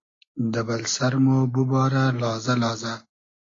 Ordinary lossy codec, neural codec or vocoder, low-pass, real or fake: AAC, 32 kbps; none; 7.2 kHz; real